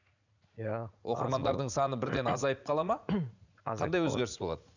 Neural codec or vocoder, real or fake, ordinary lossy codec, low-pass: vocoder, 44.1 kHz, 80 mel bands, Vocos; fake; none; 7.2 kHz